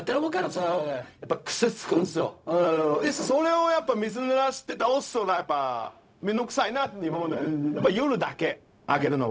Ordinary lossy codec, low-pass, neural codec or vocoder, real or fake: none; none; codec, 16 kHz, 0.4 kbps, LongCat-Audio-Codec; fake